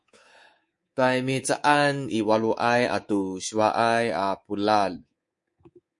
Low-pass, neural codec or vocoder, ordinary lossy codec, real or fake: 10.8 kHz; codec, 24 kHz, 3.1 kbps, DualCodec; MP3, 48 kbps; fake